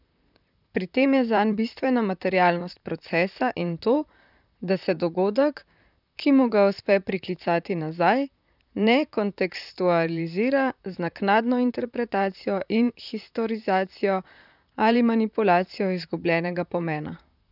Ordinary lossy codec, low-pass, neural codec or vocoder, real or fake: none; 5.4 kHz; vocoder, 44.1 kHz, 128 mel bands, Pupu-Vocoder; fake